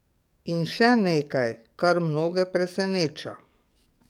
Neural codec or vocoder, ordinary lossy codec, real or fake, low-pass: codec, 44.1 kHz, 7.8 kbps, DAC; none; fake; 19.8 kHz